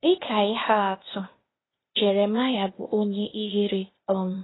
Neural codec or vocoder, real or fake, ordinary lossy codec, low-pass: codec, 16 kHz, 0.8 kbps, ZipCodec; fake; AAC, 16 kbps; 7.2 kHz